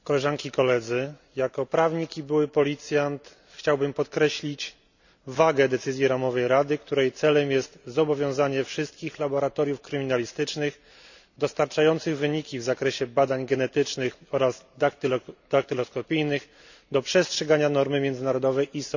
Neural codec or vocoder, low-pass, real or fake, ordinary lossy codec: none; 7.2 kHz; real; none